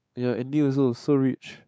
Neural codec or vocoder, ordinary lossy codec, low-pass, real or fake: codec, 16 kHz, 4 kbps, X-Codec, WavLM features, trained on Multilingual LibriSpeech; none; none; fake